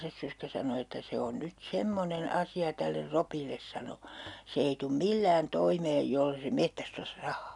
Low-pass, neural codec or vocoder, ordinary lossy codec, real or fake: 10.8 kHz; none; none; real